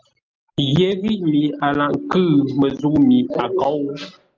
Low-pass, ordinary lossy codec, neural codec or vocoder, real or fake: 7.2 kHz; Opus, 24 kbps; none; real